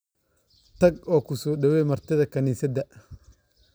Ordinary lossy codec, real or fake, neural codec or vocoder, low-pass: none; real; none; none